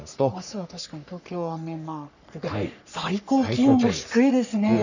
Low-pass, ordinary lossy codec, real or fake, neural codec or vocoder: 7.2 kHz; none; fake; codec, 44.1 kHz, 3.4 kbps, Pupu-Codec